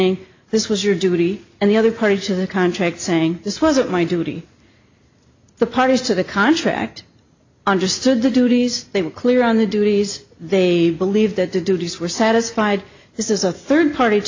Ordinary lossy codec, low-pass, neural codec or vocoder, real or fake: AAC, 48 kbps; 7.2 kHz; none; real